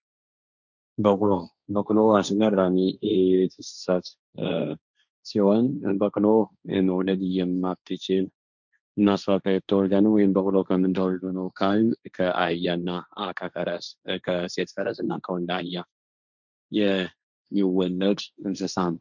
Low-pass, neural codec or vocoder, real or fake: 7.2 kHz; codec, 16 kHz, 1.1 kbps, Voila-Tokenizer; fake